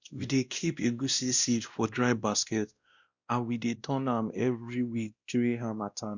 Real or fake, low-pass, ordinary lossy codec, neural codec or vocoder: fake; 7.2 kHz; Opus, 64 kbps; codec, 16 kHz, 1 kbps, X-Codec, WavLM features, trained on Multilingual LibriSpeech